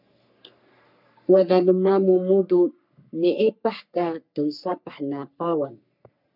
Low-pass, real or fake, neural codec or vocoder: 5.4 kHz; fake; codec, 44.1 kHz, 3.4 kbps, Pupu-Codec